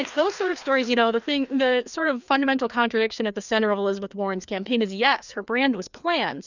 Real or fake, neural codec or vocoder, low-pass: fake; codec, 16 kHz, 2 kbps, FreqCodec, larger model; 7.2 kHz